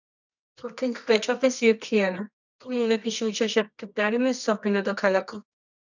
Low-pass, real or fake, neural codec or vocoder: 7.2 kHz; fake; codec, 24 kHz, 0.9 kbps, WavTokenizer, medium music audio release